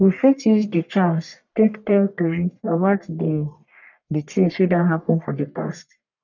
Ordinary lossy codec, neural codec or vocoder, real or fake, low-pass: none; codec, 44.1 kHz, 1.7 kbps, Pupu-Codec; fake; 7.2 kHz